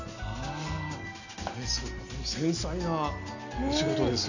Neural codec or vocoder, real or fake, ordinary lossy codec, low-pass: none; real; none; 7.2 kHz